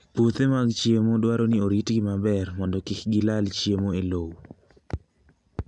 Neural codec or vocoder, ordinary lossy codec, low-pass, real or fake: none; none; 10.8 kHz; real